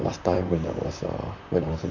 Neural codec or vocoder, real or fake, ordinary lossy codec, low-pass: vocoder, 44.1 kHz, 128 mel bands, Pupu-Vocoder; fake; none; 7.2 kHz